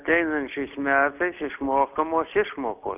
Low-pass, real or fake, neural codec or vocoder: 3.6 kHz; real; none